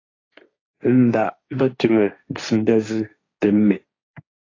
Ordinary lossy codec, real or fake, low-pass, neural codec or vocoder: AAC, 32 kbps; fake; 7.2 kHz; codec, 16 kHz, 1.1 kbps, Voila-Tokenizer